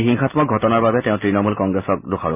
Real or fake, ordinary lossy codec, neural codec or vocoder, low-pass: real; none; none; 3.6 kHz